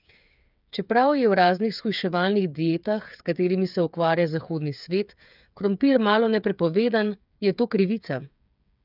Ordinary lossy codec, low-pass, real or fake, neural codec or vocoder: none; 5.4 kHz; fake; codec, 16 kHz, 8 kbps, FreqCodec, smaller model